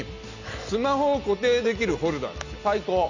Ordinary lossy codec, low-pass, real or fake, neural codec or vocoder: Opus, 64 kbps; 7.2 kHz; real; none